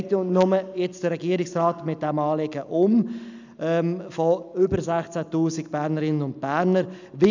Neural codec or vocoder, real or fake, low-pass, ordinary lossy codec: none; real; 7.2 kHz; none